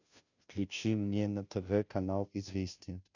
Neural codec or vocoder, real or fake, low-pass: codec, 16 kHz, 0.5 kbps, FunCodec, trained on Chinese and English, 25 frames a second; fake; 7.2 kHz